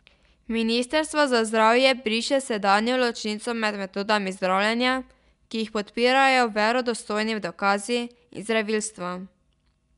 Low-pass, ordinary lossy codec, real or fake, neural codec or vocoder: 10.8 kHz; MP3, 96 kbps; real; none